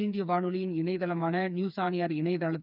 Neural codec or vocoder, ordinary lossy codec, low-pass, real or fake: codec, 16 kHz, 4 kbps, FreqCodec, smaller model; none; 5.4 kHz; fake